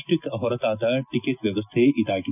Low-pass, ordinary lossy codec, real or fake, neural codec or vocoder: 3.6 kHz; none; real; none